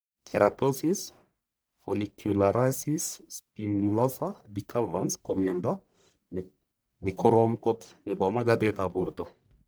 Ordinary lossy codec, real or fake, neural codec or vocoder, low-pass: none; fake; codec, 44.1 kHz, 1.7 kbps, Pupu-Codec; none